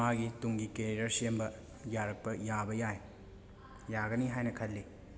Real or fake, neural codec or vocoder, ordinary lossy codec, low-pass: real; none; none; none